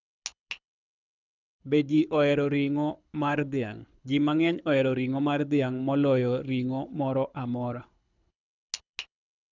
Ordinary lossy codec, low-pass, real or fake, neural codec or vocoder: none; 7.2 kHz; fake; codec, 24 kHz, 6 kbps, HILCodec